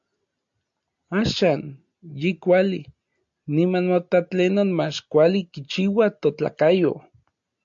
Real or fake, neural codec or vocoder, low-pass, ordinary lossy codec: real; none; 7.2 kHz; AAC, 64 kbps